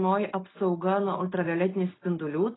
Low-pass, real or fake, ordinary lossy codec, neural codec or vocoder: 7.2 kHz; real; AAC, 16 kbps; none